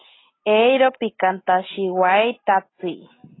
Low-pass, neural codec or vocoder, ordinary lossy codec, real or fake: 7.2 kHz; none; AAC, 16 kbps; real